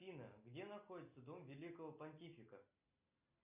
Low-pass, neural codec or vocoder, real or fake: 3.6 kHz; none; real